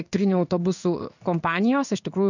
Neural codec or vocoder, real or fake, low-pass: codec, 16 kHz in and 24 kHz out, 1 kbps, XY-Tokenizer; fake; 7.2 kHz